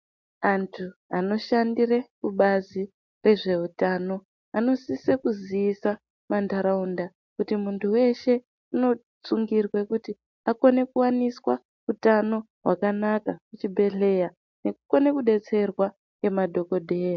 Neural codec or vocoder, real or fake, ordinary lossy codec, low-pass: none; real; MP3, 64 kbps; 7.2 kHz